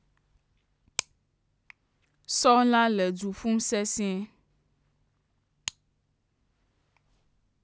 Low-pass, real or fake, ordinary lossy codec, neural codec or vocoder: none; real; none; none